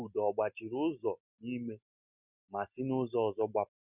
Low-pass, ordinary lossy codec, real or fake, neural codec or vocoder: 3.6 kHz; none; real; none